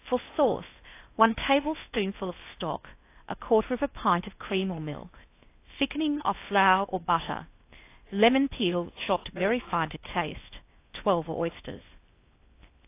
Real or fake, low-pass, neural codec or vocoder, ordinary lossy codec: fake; 3.6 kHz; codec, 16 kHz in and 24 kHz out, 0.6 kbps, FocalCodec, streaming, 4096 codes; AAC, 24 kbps